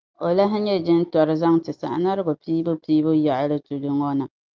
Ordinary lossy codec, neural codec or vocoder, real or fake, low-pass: Opus, 32 kbps; none; real; 7.2 kHz